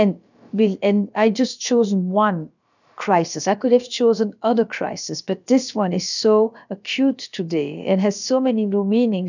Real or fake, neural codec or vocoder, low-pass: fake; codec, 16 kHz, about 1 kbps, DyCAST, with the encoder's durations; 7.2 kHz